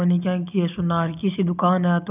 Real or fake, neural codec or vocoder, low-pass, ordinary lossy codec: real; none; 3.6 kHz; none